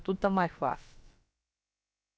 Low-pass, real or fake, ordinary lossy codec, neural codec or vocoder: none; fake; none; codec, 16 kHz, about 1 kbps, DyCAST, with the encoder's durations